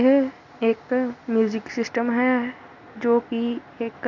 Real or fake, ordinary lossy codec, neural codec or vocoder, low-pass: real; none; none; 7.2 kHz